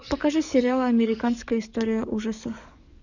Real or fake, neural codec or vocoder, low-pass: fake; codec, 44.1 kHz, 7.8 kbps, DAC; 7.2 kHz